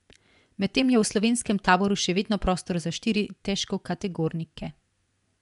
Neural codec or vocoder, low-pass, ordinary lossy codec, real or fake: vocoder, 24 kHz, 100 mel bands, Vocos; 10.8 kHz; none; fake